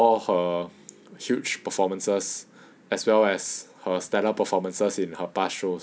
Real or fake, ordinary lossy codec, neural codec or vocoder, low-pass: real; none; none; none